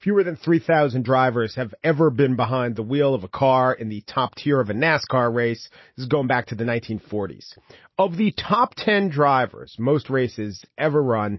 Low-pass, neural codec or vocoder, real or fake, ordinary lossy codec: 7.2 kHz; none; real; MP3, 24 kbps